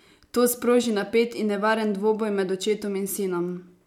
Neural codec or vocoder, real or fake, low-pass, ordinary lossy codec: none; real; 19.8 kHz; MP3, 96 kbps